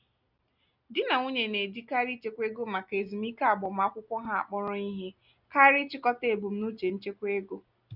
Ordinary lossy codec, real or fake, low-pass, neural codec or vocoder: none; real; 5.4 kHz; none